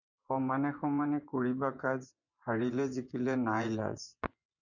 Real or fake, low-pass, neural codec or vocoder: fake; 7.2 kHz; vocoder, 24 kHz, 100 mel bands, Vocos